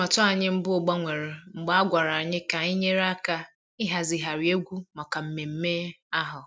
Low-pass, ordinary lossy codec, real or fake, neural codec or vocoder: none; none; real; none